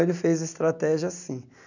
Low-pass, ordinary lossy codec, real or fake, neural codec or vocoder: 7.2 kHz; none; real; none